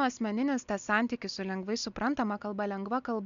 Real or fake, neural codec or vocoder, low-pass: real; none; 7.2 kHz